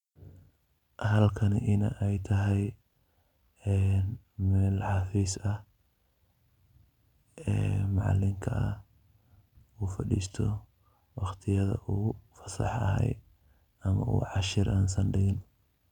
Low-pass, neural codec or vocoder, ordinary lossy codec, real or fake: 19.8 kHz; none; none; real